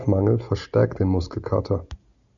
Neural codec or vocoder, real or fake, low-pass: none; real; 7.2 kHz